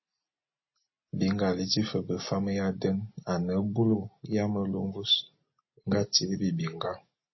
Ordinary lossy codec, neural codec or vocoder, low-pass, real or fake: MP3, 24 kbps; none; 7.2 kHz; real